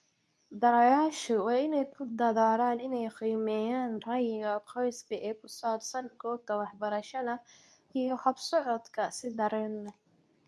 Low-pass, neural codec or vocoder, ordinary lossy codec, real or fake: none; codec, 24 kHz, 0.9 kbps, WavTokenizer, medium speech release version 2; none; fake